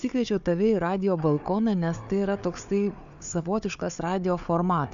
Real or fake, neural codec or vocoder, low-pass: fake; codec, 16 kHz, 4 kbps, FunCodec, trained on Chinese and English, 50 frames a second; 7.2 kHz